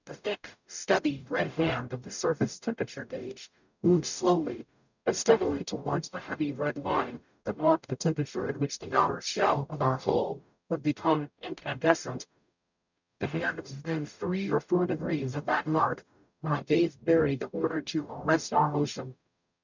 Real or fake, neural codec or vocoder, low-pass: fake; codec, 44.1 kHz, 0.9 kbps, DAC; 7.2 kHz